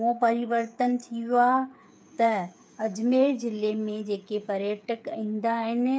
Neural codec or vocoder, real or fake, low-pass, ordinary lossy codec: codec, 16 kHz, 8 kbps, FreqCodec, smaller model; fake; none; none